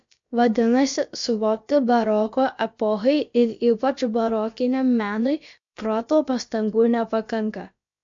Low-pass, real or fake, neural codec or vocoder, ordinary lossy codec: 7.2 kHz; fake; codec, 16 kHz, about 1 kbps, DyCAST, with the encoder's durations; MP3, 48 kbps